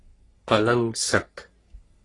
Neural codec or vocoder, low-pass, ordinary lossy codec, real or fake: codec, 44.1 kHz, 3.4 kbps, Pupu-Codec; 10.8 kHz; AAC, 32 kbps; fake